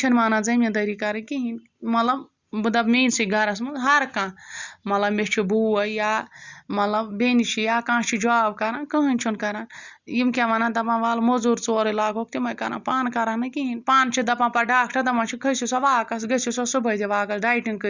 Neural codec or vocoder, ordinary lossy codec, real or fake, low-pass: none; Opus, 64 kbps; real; 7.2 kHz